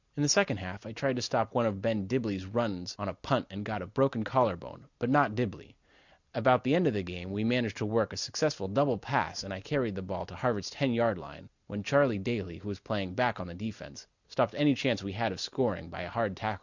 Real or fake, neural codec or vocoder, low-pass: real; none; 7.2 kHz